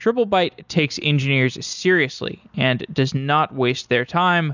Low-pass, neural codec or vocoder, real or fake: 7.2 kHz; none; real